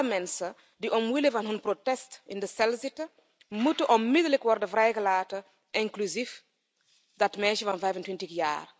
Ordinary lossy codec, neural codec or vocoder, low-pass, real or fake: none; none; none; real